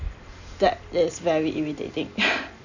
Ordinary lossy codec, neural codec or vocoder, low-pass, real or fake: none; vocoder, 44.1 kHz, 128 mel bands every 256 samples, BigVGAN v2; 7.2 kHz; fake